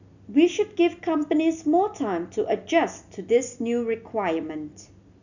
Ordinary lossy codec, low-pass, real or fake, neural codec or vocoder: none; 7.2 kHz; real; none